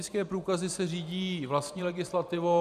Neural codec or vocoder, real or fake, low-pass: vocoder, 44.1 kHz, 128 mel bands every 256 samples, BigVGAN v2; fake; 14.4 kHz